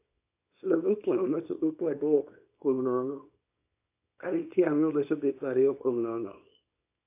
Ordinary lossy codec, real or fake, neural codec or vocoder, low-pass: AAC, 24 kbps; fake; codec, 24 kHz, 0.9 kbps, WavTokenizer, small release; 3.6 kHz